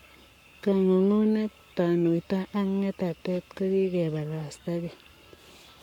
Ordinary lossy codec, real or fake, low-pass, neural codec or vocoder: none; fake; 19.8 kHz; codec, 44.1 kHz, 7.8 kbps, Pupu-Codec